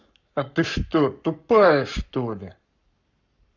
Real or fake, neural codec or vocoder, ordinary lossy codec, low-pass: fake; codec, 44.1 kHz, 7.8 kbps, Pupu-Codec; none; 7.2 kHz